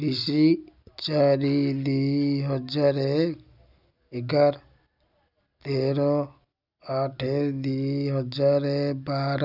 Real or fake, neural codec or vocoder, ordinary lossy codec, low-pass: real; none; none; 5.4 kHz